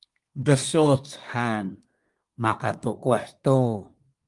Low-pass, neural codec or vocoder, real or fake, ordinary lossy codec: 10.8 kHz; codec, 24 kHz, 1 kbps, SNAC; fake; Opus, 32 kbps